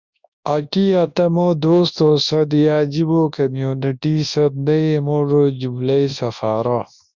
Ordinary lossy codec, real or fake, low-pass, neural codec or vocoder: Opus, 64 kbps; fake; 7.2 kHz; codec, 24 kHz, 0.9 kbps, WavTokenizer, large speech release